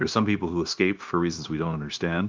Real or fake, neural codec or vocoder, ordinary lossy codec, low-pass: fake; codec, 16 kHz, 0.9 kbps, LongCat-Audio-Codec; Opus, 24 kbps; 7.2 kHz